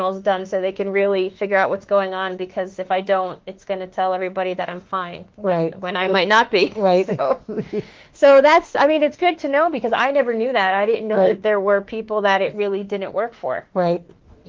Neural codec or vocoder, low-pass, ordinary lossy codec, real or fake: autoencoder, 48 kHz, 32 numbers a frame, DAC-VAE, trained on Japanese speech; 7.2 kHz; Opus, 16 kbps; fake